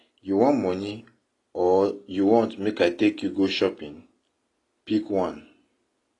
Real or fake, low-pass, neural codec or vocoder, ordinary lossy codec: real; 10.8 kHz; none; AAC, 32 kbps